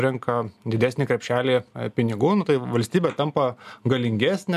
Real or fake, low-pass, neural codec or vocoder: real; 14.4 kHz; none